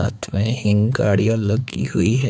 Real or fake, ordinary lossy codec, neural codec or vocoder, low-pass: fake; none; codec, 16 kHz, 4 kbps, X-Codec, HuBERT features, trained on balanced general audio; none